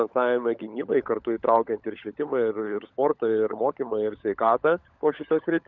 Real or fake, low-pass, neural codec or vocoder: fake; 7.2 kHz; codec, 16 kHz, 16 kbps, FunCodec, trained on LibriTTS, 50 frames a second